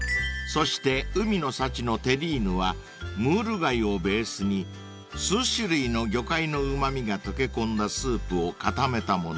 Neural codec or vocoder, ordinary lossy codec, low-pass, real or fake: none; none; none; real